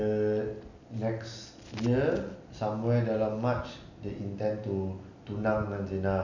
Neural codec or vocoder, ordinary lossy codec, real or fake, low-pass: none; none; real; 7.2 kHz